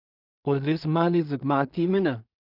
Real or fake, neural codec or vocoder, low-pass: fake; codec, 16 kHz in and 24 kHz out, 0.4 kbps, LongCat-Audio-Codec, two codebook decoder; 5.4 kHz